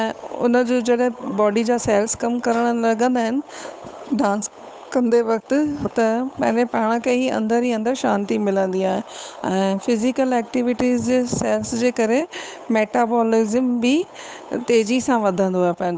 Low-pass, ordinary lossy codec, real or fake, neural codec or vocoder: none; none; fake; codec, 16 kHz, 8 kbps, FunCodec, trained on Chinese and English, 25 frames a second